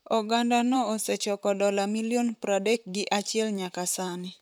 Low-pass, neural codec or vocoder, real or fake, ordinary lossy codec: none; vocoder, 44.1 kHz, 128 mel bands, Pupu-Vocoder; fake; none